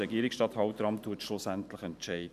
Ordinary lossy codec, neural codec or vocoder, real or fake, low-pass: none; vocoder, 44.1 kHz, 128 mel bands every 512 samples, BigVGAN v2; fake; 14.4 kHz